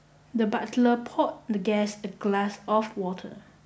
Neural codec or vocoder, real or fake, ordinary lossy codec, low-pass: none; real; none; none